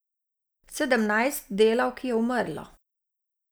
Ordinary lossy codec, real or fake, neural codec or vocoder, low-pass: none; real; none; none